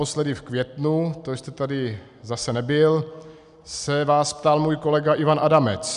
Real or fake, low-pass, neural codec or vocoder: real; 10.8 kHz; none